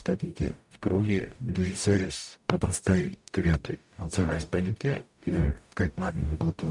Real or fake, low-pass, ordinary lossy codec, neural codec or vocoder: fake; 10.8 kHz; MP3, 96 kbps; codec, 44.1 kHz, 0.9 kbps, DAC